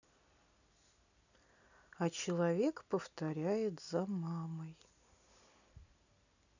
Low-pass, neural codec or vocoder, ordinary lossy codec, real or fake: 7.2 kHz; none; none; real